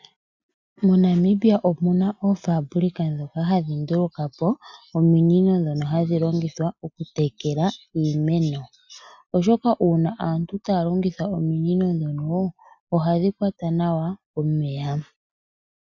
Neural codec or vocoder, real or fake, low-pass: none; real; 7.2 kHz